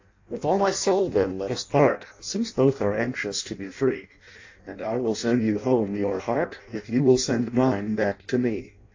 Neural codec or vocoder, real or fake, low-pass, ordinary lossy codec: codec, 16 kHz in and 24 kHz out, 0.6 kbps, FireRedTTS-2 codec; fake; 7.2 kHz; AAC, 48 kbps